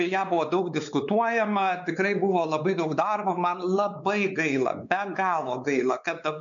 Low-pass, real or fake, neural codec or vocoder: 7.2 kHz; fake; codec, 16 kHz, 4 kbps, X-Codec, WavLM features, trained on Multilingual LibriSpeech